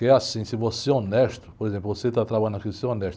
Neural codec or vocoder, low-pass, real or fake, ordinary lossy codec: none; none; real; none